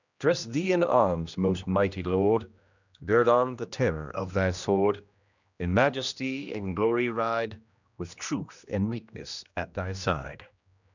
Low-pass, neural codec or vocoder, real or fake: 7.2 kHz; codec, 16 kHz, 1 kbps, X-Codec, HuBERT features, trained on general audio; fake